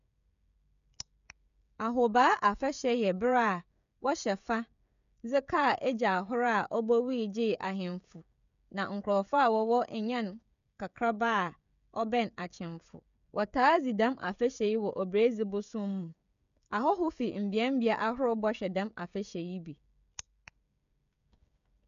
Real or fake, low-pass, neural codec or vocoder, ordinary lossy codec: fake; 7.2 kHz; codec, 16 kHz, 16 kbps, FreqCodec, smaller model; none